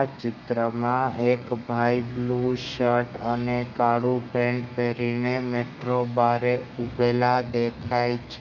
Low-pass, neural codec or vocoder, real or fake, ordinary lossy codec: 7.2 kHz; codec, 32 kHz, 1.9 kbps, SNAC; fake; none